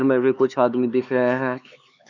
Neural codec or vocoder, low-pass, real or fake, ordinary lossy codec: codec, 16 kHz, 4 kbps, X-Codec, HuBERT features, trained on LibriSpeech; 7.2 kHz; fake; none